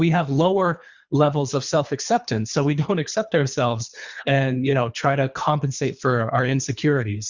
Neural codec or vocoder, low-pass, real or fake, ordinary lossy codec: codec, 24 kHz, 3 kbps, HILCodec; 7.2 kHz; fake; Opus, 64 kbps